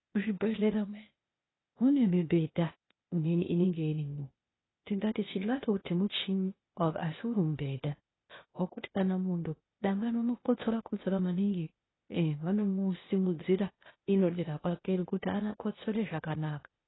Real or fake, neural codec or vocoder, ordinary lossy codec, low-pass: fake; codec, 16 kHz, 0.8 kbps, ZipCodec; AAC, 16 kbps; 7.2 kHz